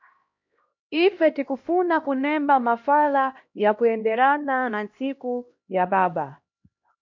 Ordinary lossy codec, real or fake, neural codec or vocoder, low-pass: MP3, 48 kbps; fake; codec, 16 kHz, 1 kbps, X-Codec, HuBERT features, trained on LibriSpeech; 7.2 kHz